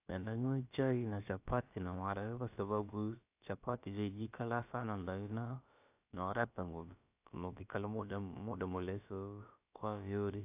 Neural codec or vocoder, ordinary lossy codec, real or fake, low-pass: codec, 16 kHz, about 1 kbps, DyCAST, with the encoder's durations; AAC, 32 kbps; fake; 3.6 kHz